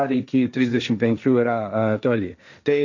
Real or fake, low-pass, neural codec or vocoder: fake; 7.2 kHz; codec, 16 kHz, 1.1 kbps, Voila-Tokenizer